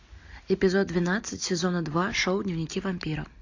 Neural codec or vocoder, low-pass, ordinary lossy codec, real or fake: none; 7.2 kHz; AAC, 48 kbps; real